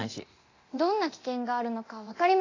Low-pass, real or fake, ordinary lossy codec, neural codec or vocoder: 7.2 kHz; fake; AAC, 32 kbps; codec, 24 kHz, 0.9 kbps, DualCodec